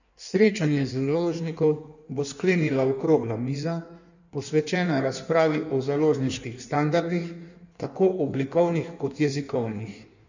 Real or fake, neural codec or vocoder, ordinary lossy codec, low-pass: fake; codec, 16 kHz in and 24 kHz out, 1.1 kbps, FireRedTTS-2 codec; none; 7.2 kHz